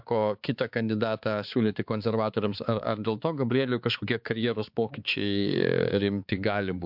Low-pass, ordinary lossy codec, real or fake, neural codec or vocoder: 5.4 kHz; MP3, 48 kbps; fake; codec, 16 kHz, 4 kbps, X-Codec, HuBERT features, trained on balanced general audio